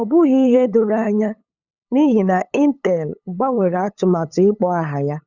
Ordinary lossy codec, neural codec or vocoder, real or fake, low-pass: none; codec, 16 kHz, 8 kbps, FunCodec, trained on LibriTTS, 25 frames a second; fake; 7.2 kHz